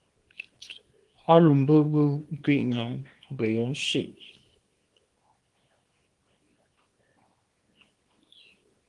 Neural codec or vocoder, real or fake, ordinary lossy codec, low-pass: codec, 24 kHz, 0.9 kbps, WavTokenizer, small release; fake; Opus, 24 kbps; 10.8 kHz